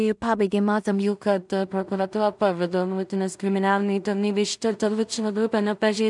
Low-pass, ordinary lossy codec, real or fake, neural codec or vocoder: 10.8 kHz; MP3, 96 kbps; fake; codec, 16 kHz in and 24 kHz out, 0.4 kbps, LongCat-Audio-Codec, two codebook decoder